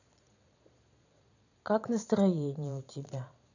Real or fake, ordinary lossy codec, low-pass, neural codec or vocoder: fake; none; 7.2 kHz; vocoder, 22.05 kHz, 80 mel bands, Vocos